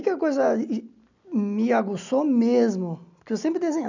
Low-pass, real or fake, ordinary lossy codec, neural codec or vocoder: 7.2 kHz; real; none; none